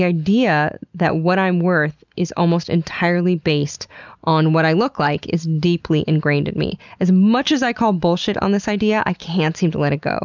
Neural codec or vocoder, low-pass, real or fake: none; 7.2 kHz; real